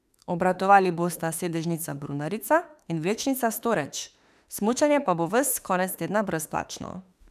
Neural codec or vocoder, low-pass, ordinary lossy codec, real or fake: autoencoder, 48 kHz, 32 numbers a frame, DAC-VAE, trained on Japanese speech; 14.4 kHz; none; fake